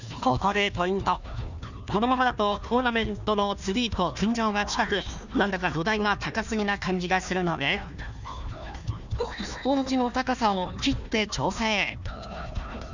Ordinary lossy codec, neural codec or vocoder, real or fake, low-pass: none; codec, 16 kHz, 1 kbps, FunCodec, trained on Chinese and English, 50 frames a second; fake; 7.2 kHz